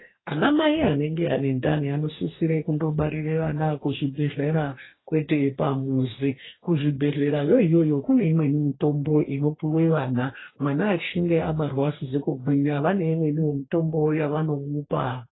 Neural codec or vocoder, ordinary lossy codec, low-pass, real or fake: codec, 44.1 kHz, 2.6 kbps, DAC; AAC, 16 kbps; 7.2 kHz; fake